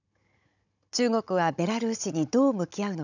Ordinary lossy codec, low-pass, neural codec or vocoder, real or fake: none; 7.2 kHz; codec, 16 kHz, 16 kbps, FunCodec, trained on Chinese and English, 50 frames a second; fake